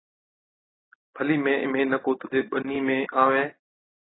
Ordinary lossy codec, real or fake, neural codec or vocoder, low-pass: AAC, 16 kbps; real; none; 7.2 kHz